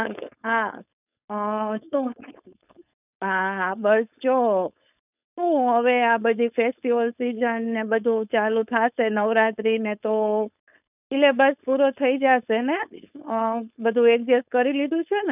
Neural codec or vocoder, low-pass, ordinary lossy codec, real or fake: codec, 16 kHz, 4.8 kbps, FACodec; 3.6 kHz; none; fake